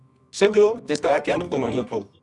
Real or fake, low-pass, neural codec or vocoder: fake; 10.8 kHz; codec, 24 kHz, 0.9 kbps, WavTokenizer, medium music audio release